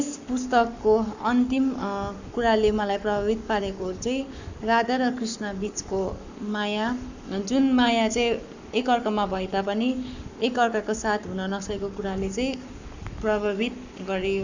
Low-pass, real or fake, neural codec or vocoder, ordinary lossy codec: 7.2 kHz; fake; codec, 44.1 kHz, 7.8 kbps, Pupu-Codec; none